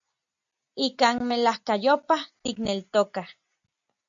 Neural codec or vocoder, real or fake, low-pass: none; real; 7.2 kHz